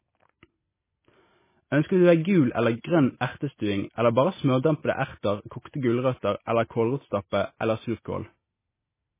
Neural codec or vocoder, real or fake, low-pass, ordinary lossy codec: none; real; 3.6 kHz; MP3, 16 kbps